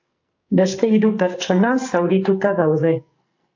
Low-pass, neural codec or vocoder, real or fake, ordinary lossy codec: 7.2 kHz; codec, 44.1 kHz, 2.6 kbps, SNAC; fake; AAC, 48 kbps